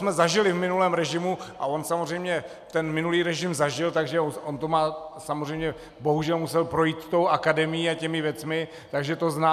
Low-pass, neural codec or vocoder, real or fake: 14.4 kHz; none; real